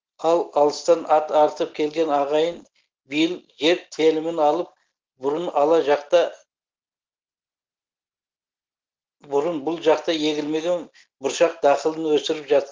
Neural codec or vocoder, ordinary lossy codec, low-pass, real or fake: none; Opus, 16 kbps; 7.2 kHz; real